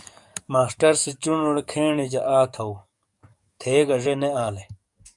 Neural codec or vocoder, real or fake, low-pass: codec, 44.1 kHz, 7.8 kbps, DAC; fake; 10.8 kHz